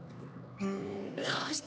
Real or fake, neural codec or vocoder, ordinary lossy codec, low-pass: fake; codec, 16 kHz, 2 kbps, X-Codec, HuBERT features, trained on LibriSpeech; none; none